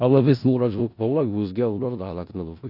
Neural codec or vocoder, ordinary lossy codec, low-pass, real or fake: codec, 16 kHz in and 24 kHz out, 0.4 kbps, LongCat-Audio-Codec, four codebook decoder; none; 5.4 kHz; fake